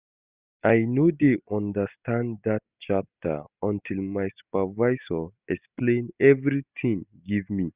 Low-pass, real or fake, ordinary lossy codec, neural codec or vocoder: 3.6 kHz; real; Opus, 64 kbps; none